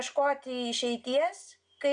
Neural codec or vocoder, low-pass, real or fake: none; 9.9 kHz; real